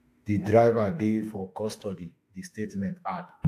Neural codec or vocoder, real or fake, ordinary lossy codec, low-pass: autoencoder, 48 kHz, 32 numbers a frame, DAC-VAE, trained on Japanese speech; fake; AAC, 64 kbps; 14.4 kHz